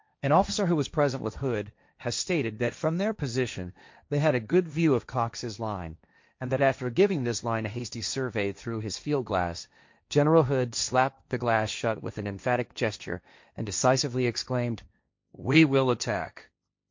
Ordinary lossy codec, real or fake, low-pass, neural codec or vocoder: MP3, 48 kbps; fake; 7.2 kHz; codec, 16 kHz, 1.1 kbps, Voila-Tokenizer